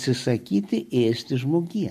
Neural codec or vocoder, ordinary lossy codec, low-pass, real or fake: none; MP3, 64 kbps; 14.4 kHz; real